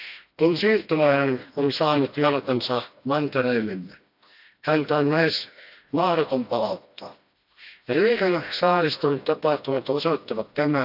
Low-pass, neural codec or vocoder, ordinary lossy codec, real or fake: 5.4 kHz; codec, 16 kHz, 1 kbps, FreqCodec, smaller model; AAC, 48 kbps; fake